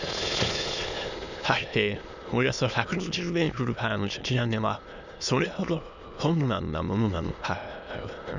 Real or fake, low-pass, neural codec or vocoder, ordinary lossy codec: fake; 7.2 kHz; autoencoder, 22.05 kHz, a latent of 192 numbers a frame, VITS, trained on many speakers; none